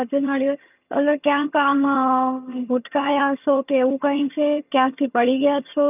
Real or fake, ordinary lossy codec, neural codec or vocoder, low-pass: fake; none; vocoder, 22.05 kHz, 80 mel bands, HiFi-GAN; 3.6 kHz